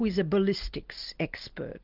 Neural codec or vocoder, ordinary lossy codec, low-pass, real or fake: none; Opus, 32 kbps; 5.4 kHz; real